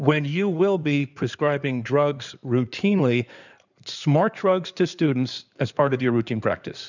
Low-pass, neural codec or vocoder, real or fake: 7.2 kHz; codec, 16 kHz in and 24 kHz out, 2.2 kbps, FireRedTTS-2 codec; fake